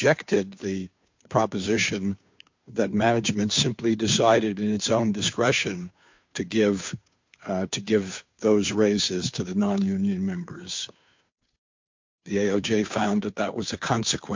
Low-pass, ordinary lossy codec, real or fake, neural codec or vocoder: 7.2 kHz; MP3, 48 kbps; fake; codec, 16 kHz, 2 kbps, FunCodec, trained on Chinese and English, 25 frames a second